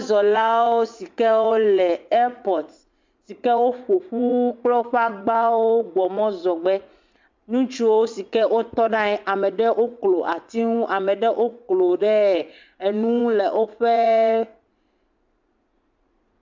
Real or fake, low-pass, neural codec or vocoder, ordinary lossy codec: fake; 7.2 kHz; vocoder, 44.1 kHz, 80 mel bands, Vocos; MP3, 64 kbps